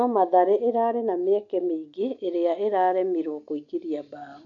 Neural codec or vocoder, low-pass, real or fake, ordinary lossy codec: none; 7.2 kHz; real; none